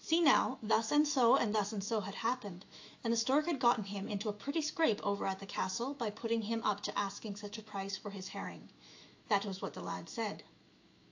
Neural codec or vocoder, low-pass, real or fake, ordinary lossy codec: autoencoder, 48 kHz, 128 numbers a frame, DAC-VAE, trained on Japanese speech; 7.2 kHz; fake; AAC, 48 kbps